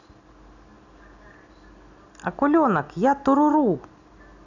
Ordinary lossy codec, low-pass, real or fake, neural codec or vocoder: none; 7.2 kHz; real; none